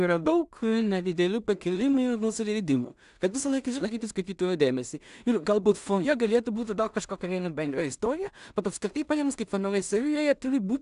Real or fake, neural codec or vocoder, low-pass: fake; codec, 16 kHz in and 24 kHz out, 0.4 kbps, LongCat-Audio-Codec, two codebook decoder; 10.8 kHz